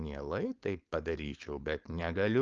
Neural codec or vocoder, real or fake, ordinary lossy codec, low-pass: codec, 16 kHz, 4.8 kbps, FACodec; fake; Opus, 24 kbps; 7.2 kHz